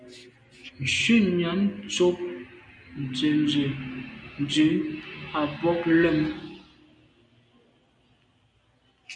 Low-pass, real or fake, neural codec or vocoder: 9.9 kHz; real; none